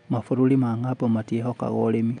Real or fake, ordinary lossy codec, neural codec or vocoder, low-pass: real; none; none; 9.9 kHz